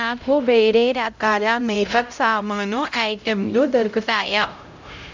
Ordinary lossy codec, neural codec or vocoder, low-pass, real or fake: MP3, 64 kbps; codec, 16 kHz, 0.5 kbps, X-Codec, HuBERT features, trained on LibriSpeech; 7.2 kHz; fake